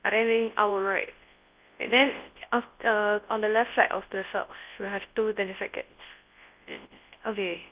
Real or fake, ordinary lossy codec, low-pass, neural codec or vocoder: fake; Opus, 32 kbps; 3.6 kHz; codec, 24 kHz, 0.9 kbps, WavTokenizer, large speech release